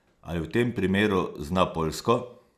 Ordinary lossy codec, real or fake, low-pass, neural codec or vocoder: none; real; 14.4 kHz; none